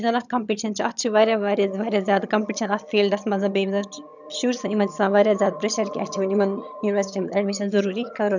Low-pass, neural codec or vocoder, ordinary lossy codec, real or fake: 7.2 kHz; vocoder, 22.05 kHz, 80 mel bands, HiFi-GAN; none; fake